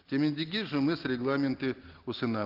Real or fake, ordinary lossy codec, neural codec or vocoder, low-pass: real; Opus, 16 kbps; none; 5.4 kHz